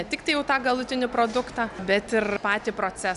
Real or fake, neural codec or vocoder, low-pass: real; none; 10.8 kHz